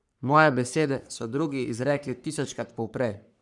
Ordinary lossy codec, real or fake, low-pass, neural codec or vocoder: none; fake; 10.8 kHz; codec, 44.1 kHz, 3.4 kbps, Pupu-Codec